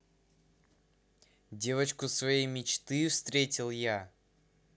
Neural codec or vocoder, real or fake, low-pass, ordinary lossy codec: none; real; none; none